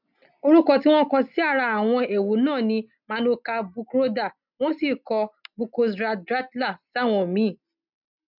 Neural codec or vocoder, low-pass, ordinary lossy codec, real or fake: none; 5.4 kHz; none; real